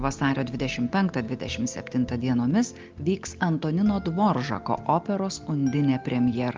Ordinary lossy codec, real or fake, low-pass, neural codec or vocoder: Opus, 32 kbps; real; 7.2 kHz; none